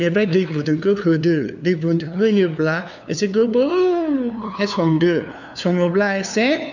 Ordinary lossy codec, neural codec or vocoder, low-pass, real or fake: none; codec, 16 kHz, 2 kbps, FunCodec, trained on LibriTTS, 25 frames a second; 7.2 kHz; fake